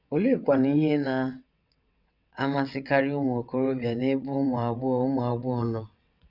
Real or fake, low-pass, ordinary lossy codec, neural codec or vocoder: fake; 5.4 kHz; none; vocoder, 22.05 kHz, 80 mel bands, WaveNeXt